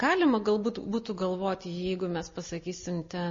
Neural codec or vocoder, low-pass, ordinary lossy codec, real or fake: none; 7.2 kHz; MP3, 32 kbps; real